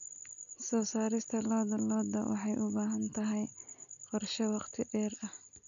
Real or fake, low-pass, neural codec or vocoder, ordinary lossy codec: real; 7.2 kHz; none; none